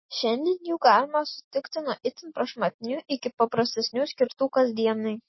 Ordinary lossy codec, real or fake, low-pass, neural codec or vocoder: MP3, 24 kbps; real; 7.2 kHz; none